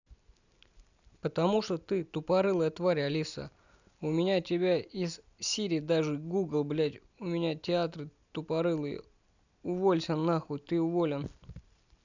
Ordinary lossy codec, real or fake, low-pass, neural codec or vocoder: none; real; 7.2 kHz; none